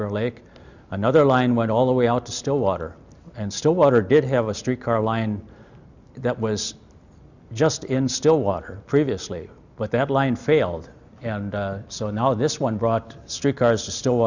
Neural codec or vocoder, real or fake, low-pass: none; real; 7.2 kHz